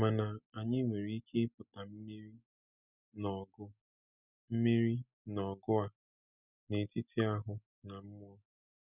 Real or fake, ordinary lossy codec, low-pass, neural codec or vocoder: real; none; 3.6 kHz; none